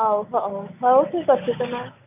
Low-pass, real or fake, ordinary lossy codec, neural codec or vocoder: 3.6 kHz; real; none; none